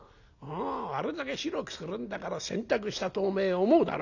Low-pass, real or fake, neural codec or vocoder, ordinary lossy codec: 7.2 kHz; real; none; none